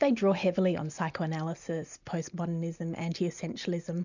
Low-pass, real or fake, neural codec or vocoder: 7.2 kHz; real; none